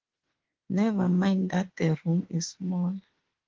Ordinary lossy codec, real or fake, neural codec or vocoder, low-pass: Opus, 16 kbps; fake; autoencoder, 48 kHz, 32 numbers a frame, DAC-VAE, trained on Japanese speech; 7.2 kHz